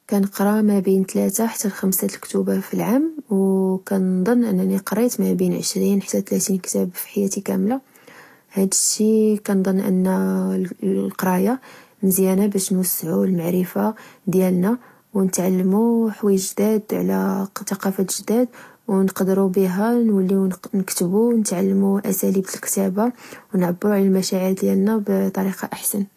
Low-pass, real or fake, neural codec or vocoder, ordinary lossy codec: 14.4 kHz; real; none; AAC, 48 kbps